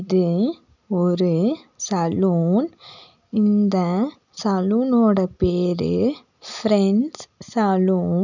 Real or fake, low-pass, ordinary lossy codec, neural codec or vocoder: real; 7.2 kHz; none; none